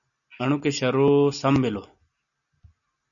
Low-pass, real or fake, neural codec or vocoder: 7.2 kHz; real; none